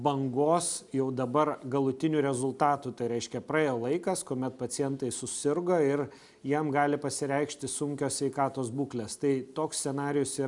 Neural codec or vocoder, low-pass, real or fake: none; 10.8 kHz; real